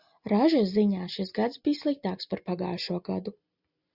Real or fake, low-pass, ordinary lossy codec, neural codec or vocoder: real; 5.4 kHz; Opus, 64 kbps; none